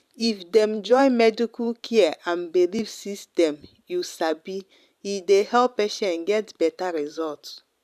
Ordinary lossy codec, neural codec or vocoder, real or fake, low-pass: none; vocoder, 44.1 kHz, 128 mel bands every 512 samples, BigVGAN v2; fake; 14.4 kHz